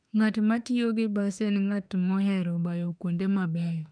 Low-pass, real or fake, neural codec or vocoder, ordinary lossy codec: 9.9 kHz; fake; autoencoder, 48 kHz, 32 numbers a frame, DAC-VAE, trained on Japanese speech; none